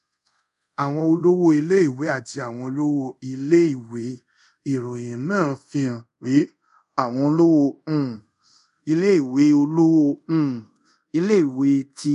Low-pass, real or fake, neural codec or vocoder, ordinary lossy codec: 10.8 kHz; fake; codec, 24 kHz, 0.5 kbps, DualCodec; MP3, 96 kbps